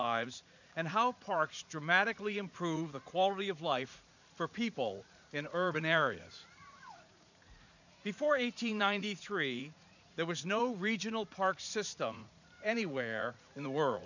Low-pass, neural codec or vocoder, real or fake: 7.2 kHz; vocoder, 44.1 kHz, 80 mel bands, Vocos; fake